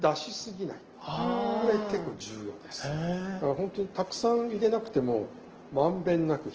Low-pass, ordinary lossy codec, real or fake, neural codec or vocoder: 7.2 kHz; Opus, 16 kbps; real; none